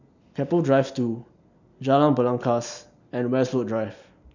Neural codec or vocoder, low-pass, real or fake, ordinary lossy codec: none; 7.2 kHz; real; none